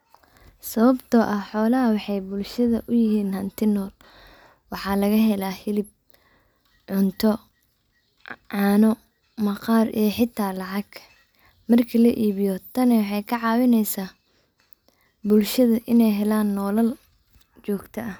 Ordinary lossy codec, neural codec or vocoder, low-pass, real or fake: none; none; none; real